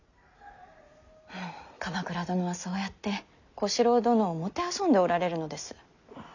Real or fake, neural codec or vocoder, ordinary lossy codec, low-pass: real; none; none; 7.2 kHz